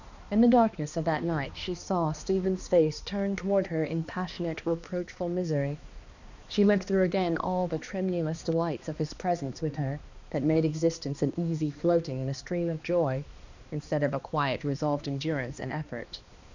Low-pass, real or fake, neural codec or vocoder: 7.2 kHz; fake; codec, 16 kHz, 2 kbps, X-Codec, HuBERT features, trained on balanced general audio